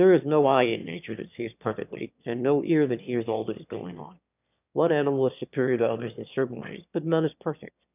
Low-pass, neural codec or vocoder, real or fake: 3.6 kHz; autoencoder, 22.05 kHz, a latent of 192 numbers a frame, VITS, trained on one speaker; fake